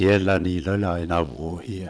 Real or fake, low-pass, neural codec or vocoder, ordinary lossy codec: fake; none; vocoder, 22.05 kHz, 80 mel bands, Vocos; none